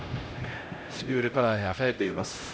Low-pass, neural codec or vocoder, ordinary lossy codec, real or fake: none; codec, 16 kHz, 0.5 kbps, X-Codec, HuBERT features, trained on LibriSpeech; none; fake